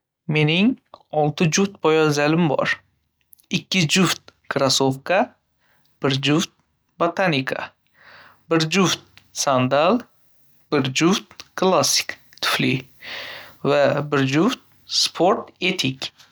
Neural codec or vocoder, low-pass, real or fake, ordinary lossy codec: none; none; real; none